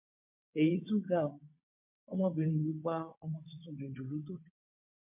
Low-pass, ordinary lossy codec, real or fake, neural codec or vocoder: 3.6 kHz; MP3, 32 kbps; fake; vocoder, 22.05 kHz, 80 mel bands, WaveNeXt